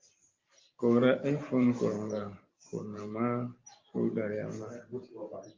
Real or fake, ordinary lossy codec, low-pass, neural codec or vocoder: fake; Opus, 32 kbps; 7.2 kHz; vocoder, 44.1 kHz, 128 mel bands every 512 samples, BigVGAN v2